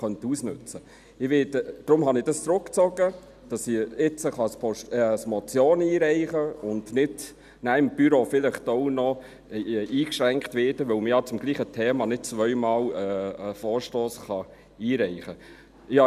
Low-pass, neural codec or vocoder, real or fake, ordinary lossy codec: 14.4 kHz; none; real; none